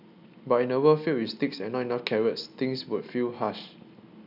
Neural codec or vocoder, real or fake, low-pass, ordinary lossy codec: none; real; 5.4 kHz; none